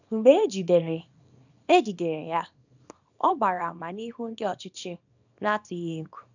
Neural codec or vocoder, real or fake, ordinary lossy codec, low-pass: codec, 24 kHz, 0.9 kbps, WavTokenizer, small release; fake; none; 7.2 kHz